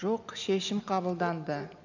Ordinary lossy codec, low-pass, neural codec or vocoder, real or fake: none; 7.2 kHz; none; real